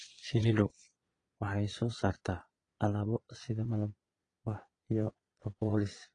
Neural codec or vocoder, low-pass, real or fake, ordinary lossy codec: vocoder, 22.05 kHz, 80 mel bands, Vocos; 9.9 kHz; fake; AAC, 32 kbps